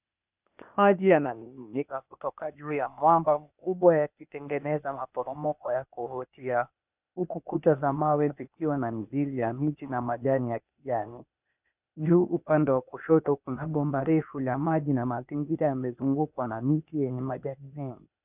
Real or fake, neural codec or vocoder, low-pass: fake; codec, 16 kHz, 0.8 kbps, ZipCodec; 3.6 kHz